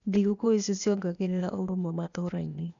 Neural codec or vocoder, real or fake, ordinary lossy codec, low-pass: codec, 16 kHz, 0.8 kbps, ZipCodec; fake; none; 7.2 kHz